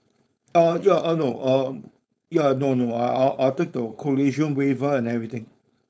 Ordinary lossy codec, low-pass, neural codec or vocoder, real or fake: none; none; codec, 16 kHz, 4.8 kbps, FACodec; fake